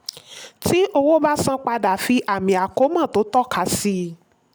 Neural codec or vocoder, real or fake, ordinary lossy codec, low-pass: none; real; none; none